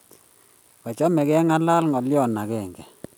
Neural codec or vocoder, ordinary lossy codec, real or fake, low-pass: none; none; real; none